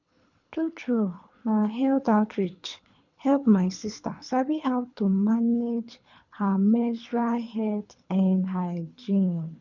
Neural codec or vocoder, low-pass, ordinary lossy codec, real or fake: codec, 24 kHz, 3 kbps, HILCodec; 7.2 kHz; none; fake